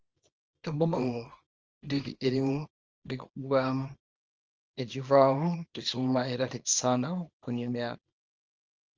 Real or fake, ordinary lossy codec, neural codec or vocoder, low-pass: fake; Opus, 24 kbps; codec, 24 kHz, 0.9 kbps, WavTokenizer, small release; 7.2 kHz